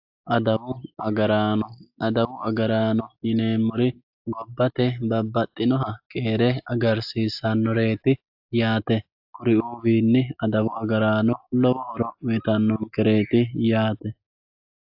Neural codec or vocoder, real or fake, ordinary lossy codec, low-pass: none; real; AAC, 48 kbps; 5.4 kHz